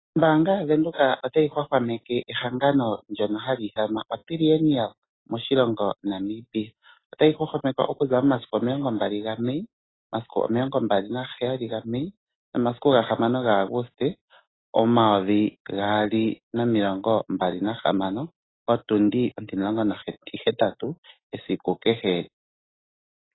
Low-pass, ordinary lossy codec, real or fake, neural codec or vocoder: 7.2 kHz; AAC, 16 kbps; real; none